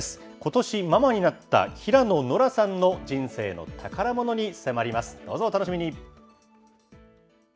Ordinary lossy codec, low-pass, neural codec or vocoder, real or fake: none; none; none; real